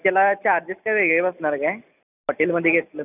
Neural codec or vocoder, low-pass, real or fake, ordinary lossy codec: none; 3.6 kHz; real; none